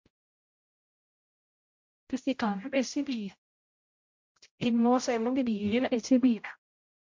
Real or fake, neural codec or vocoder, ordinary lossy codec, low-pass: fake; codec, 16 kHz, 0.5 kbps, X-Codec, HuBERT features, trained on general audio; MP3, 48 kbps; 7.2 kHz